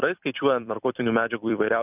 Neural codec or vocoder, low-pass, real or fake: vocoder, 44.1 kHz, 128 mel bands every 256 samples, BigVGAN v2; 3.6 kHz; fake